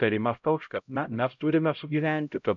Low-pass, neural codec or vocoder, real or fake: 7.2 kHz; codec, 16 kHz, 0.5 kbps, X-Codec, HuBERT features, trained on LibriSpeech; fake